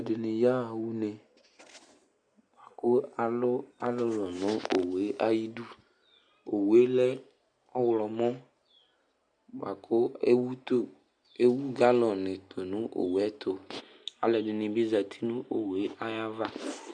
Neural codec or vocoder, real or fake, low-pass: none; real; 9.9 kHz